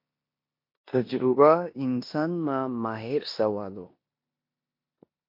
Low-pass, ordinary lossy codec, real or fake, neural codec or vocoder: 5.4 kHz; MP3, 32 kbps; fake; codec, 16 kHz in and 24 kHz out, 0.9 kbps, LongCat-Audio-Codec, four codebook decoder